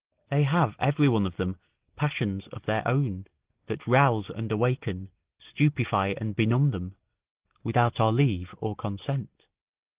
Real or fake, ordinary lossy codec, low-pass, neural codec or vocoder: real; Opus, 16 kbps; 3.6 kHz; none